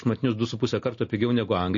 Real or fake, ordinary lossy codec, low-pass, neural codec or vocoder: real; MP3, 32 kbps; 7.2 kHz; none